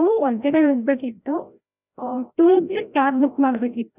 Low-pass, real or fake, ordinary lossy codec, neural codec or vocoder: 3.6 kHz; fake; none; codec, 16 kHz, 0.5 kbps, FreqCodec, larger model